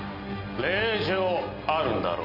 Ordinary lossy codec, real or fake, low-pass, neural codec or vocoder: none; real; 5.4 kHz; none